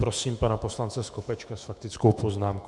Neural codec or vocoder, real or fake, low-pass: autoencoder, 48 kHz, 128 numbers a frame, DAC-VAE, trained on Japanese speech; fake; 10.8 kHz